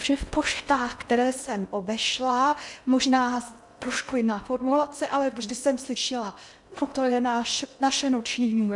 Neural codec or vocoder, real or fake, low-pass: codec, 16 kHz in and 24 kHz out, 0.6 kbps, FocalCodec, streaming, 2048 codes; fake; 10.8 kHz